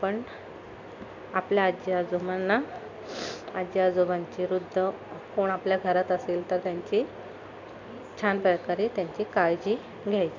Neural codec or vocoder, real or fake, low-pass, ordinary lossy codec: none; real; 7.2 kHz; MP3, 64 kbps